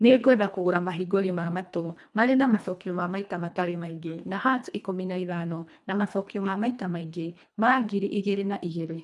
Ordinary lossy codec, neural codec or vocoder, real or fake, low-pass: none; codec, 24 kHz, 1.5 kbps, HILCodec; fake; none